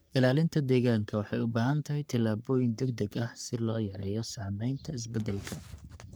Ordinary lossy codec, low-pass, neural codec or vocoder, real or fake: none; none; codec, 44.1 kHz, 3.4 kbps, Pupu-Codec; fake